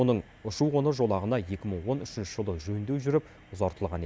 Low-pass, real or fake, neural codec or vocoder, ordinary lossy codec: none; real; none; none